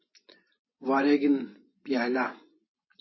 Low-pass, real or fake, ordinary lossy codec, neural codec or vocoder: 7.2 kHz; fake; MP3, 24 kbps; vocoder, 24 kHz, 100 mel bands, Vocos